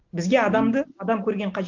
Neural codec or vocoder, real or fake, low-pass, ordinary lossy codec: none; real; 7.2 kHz; Opus, 32 kbps